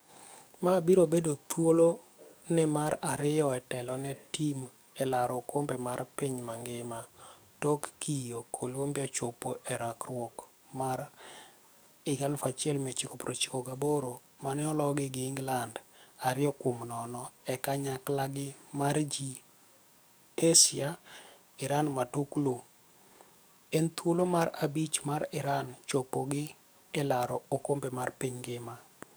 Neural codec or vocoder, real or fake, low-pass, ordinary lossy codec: codec, 44.1 kHz, 7.8 kbps, DAC; fake; none; none